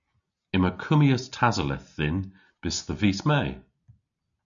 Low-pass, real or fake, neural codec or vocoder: 7.2 kHz; real; none